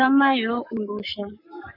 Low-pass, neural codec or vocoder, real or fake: 5.4 kHz; codec, 44.1 kHz, 7.8 kbps, Pupu-Codec; fake